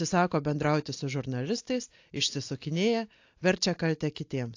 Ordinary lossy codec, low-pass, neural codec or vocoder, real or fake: AAC, 48 kbps; 7.2 kHz; none; real